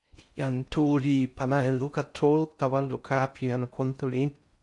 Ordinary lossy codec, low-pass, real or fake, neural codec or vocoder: AAC, 64 kbps; 10.8 kHz; fake; codec, 16 kHz in and 24 kHz out, 0.6 kbps, FocalCodec, streaming, 4096 codes